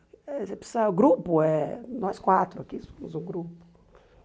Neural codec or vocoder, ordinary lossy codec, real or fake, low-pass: none; none; real; none